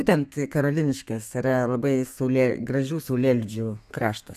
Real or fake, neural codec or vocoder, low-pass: fake; codec, 32 kHz, 1.9 kbps, SNAC; 14.4 kHz